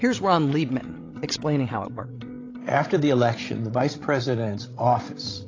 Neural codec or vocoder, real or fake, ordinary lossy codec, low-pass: codec, 16 kHz, 16 kbps, FreqCodec, larger model; fake; AAC, 32 kbps; 7.2 kHz